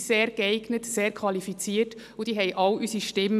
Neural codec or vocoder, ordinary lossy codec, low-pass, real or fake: none; none; 14.4 kHz; real